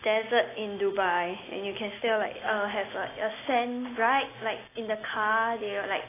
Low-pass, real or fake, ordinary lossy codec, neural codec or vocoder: 3.6 kHz; real; AAC, 16 kbps; none